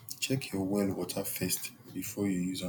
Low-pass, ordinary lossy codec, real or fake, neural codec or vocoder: none; none; real; none